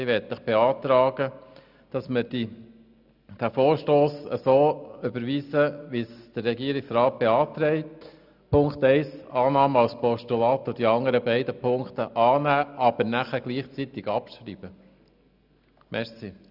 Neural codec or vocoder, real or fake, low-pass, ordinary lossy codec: none; real; 5.4 kHz; none